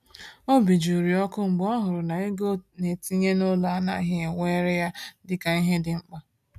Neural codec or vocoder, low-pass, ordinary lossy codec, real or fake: none; 14.4 kHz; none; real